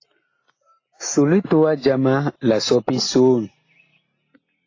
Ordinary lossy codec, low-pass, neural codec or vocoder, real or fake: AAC, 32 kbps; 7.2 kHz; none; real